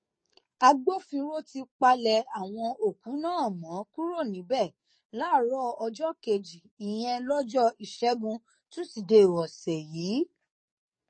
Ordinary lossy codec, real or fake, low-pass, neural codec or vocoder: MP3, 32 kbps; fake; 9.9 kHz; codec, 44.1 kHz, 7.8 kbps, DAC